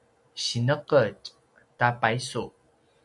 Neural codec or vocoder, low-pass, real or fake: none; 10.8 kHz; real